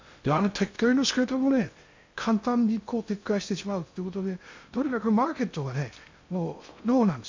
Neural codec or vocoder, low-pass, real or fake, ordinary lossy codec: codec, 16 kHz in and 24 kHz out, 0.6 kbps, FocalCodec, streaming, 2048 codes; 7.2 kHz; fake; MP3, 64 kbps